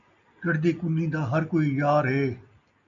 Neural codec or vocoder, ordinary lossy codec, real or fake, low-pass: none; MP3, 96 kbps; real; 7.2 kHz